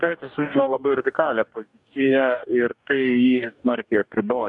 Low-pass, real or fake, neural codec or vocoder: 10.8 kHz; fake; codec, 44.1 kHz, 2.6 kbps, DAC